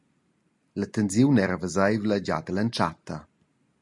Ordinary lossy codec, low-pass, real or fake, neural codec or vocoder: MP3, 64 kbps; 10.8 kHz; real; none